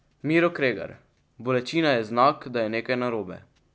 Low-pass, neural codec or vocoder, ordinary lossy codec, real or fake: none; none; none; real